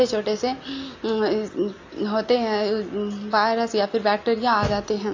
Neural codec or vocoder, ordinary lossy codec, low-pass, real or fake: none; AAC, 48 kbps; 7.2 kHz; real